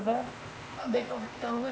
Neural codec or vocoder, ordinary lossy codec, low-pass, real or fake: codec, 16 kHz, 0.8 kbps, ZipCodec; none; none; fake